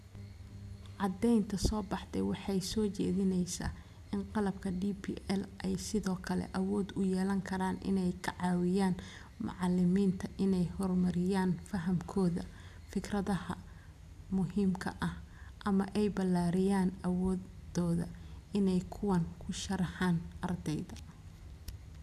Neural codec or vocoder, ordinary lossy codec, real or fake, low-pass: none; none; real; 14.4 kHz